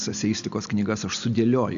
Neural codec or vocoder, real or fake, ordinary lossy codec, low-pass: none; real; AAC, 96 kbps; 7.2 kHz